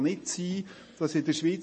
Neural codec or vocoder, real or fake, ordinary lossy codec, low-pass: none; real; MP3, 32 kbps; 10.8 kHz